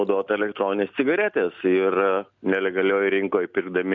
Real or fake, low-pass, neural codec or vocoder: real; 7.2 kHz; none